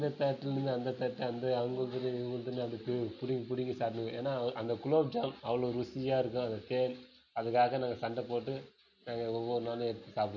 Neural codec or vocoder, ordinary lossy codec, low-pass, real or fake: none; none; 7.2 kHz; real